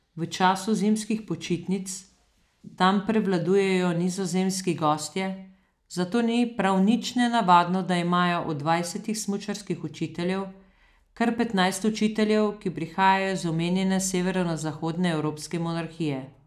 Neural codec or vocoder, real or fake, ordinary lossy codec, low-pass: none; real; none; 14.4 kHz